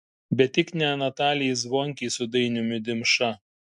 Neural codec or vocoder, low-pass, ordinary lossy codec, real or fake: none; 10.8 kHz; MP3, 64 kbps; real